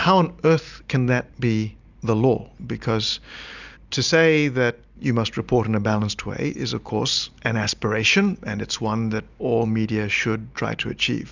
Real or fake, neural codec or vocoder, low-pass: real; none; 7.2 kHz